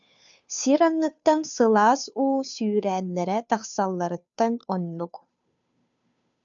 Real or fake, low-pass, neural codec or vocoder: fake; 7.2 kHz; codec, 16 kHz, 2 kbps, FunCodec, trained on Chinese and English, 25 frames a second